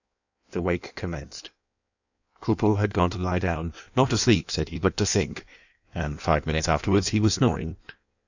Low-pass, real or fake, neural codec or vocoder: 7.2 kHz; fake; codec, 16 kHz in and 24 kHz out, 1.1 kbps, FireRedTTS-2 codec